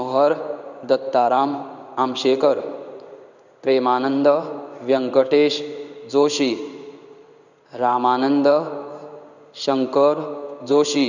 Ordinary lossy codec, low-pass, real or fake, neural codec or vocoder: none; 7.2 kHz; fake; codec, 16 kHz in and 24 kHz out, 1 kbps, XY-Tokenizer